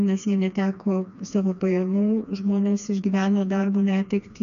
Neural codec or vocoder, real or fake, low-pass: codec, 16 kHz, 2 kbps, FreqCodec, smaller model; fake; 7.2 kHz